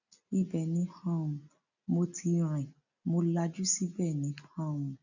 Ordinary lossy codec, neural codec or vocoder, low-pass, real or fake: none; none; 7.2 kHz; real